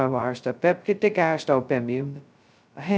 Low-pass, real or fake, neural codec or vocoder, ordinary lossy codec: none; fake; codec, 16 kHz, 0.2 kbps, FocalCodec; none